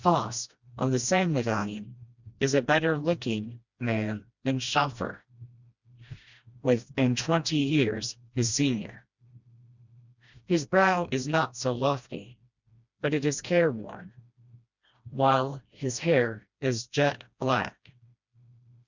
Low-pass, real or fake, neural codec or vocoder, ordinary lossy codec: 7.2 kHz; fake; codec, 16 kHz, 1 kbps, FreqCodec, smaller model; Opus, 64 kbps